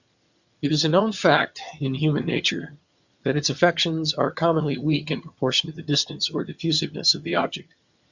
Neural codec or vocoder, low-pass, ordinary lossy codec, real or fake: vocoder, 22.05 kHz, 80 mel bands, HiFi-GAN; 7.2 kHz; Opus, 64 kbps; fake